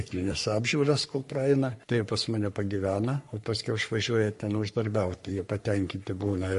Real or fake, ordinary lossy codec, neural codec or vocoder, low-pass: fake; MP3, 48 kbps; codec, 44.1 kHz, 3.4 kbps, Pupu-Codec; 14.4 kHz